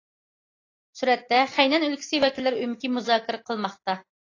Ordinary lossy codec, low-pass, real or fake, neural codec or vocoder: AAC, 32 kbps; 7.2 kHz; real; none